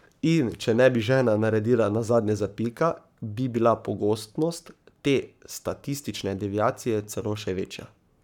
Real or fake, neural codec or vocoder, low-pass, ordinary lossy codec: fake; codec, 44.1 kHz, 7.8 kbps, Pupu-Codec; 19.8 kHz; none